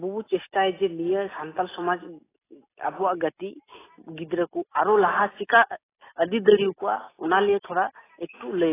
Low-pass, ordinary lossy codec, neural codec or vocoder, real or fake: 3.6 kHz; AAC, 16 kbps; none; real